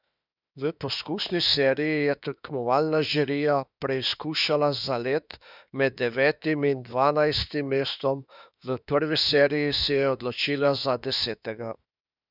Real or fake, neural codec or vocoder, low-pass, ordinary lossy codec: fake; autoencoder, 48 kHz, 32 numbers a frame, DAC-VAE, trained on Japanese speech; 5.4 kHz; none